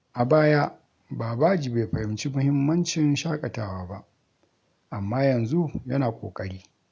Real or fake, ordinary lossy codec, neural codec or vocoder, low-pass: real; none; none; none